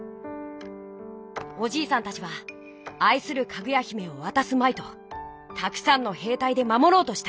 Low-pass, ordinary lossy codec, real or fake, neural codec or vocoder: none; none; real; none